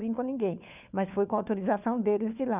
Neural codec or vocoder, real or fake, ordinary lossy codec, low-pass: codec, 16 kHz, 4 kbps, FunCodec, trained on LibriTTS, 50 frames a second; fake; none; 3.6 kHz